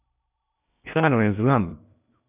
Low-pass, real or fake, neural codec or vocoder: 3.6 kHz; fake; codec, 16 kHz in and 24 kHz out, 0.8 kbps, FocalCodec, streaming, 65536 codes